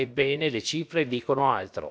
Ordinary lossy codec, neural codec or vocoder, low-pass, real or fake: none; codec, 16 kHz, about 1 kbps, DyCAST, with the encoder's durations; none; fake